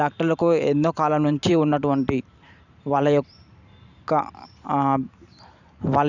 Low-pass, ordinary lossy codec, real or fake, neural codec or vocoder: 7.2 kHz; none; fake; vocoder, 44.1 kHz, 128 mel bands every 512 samples, BigVGAN v2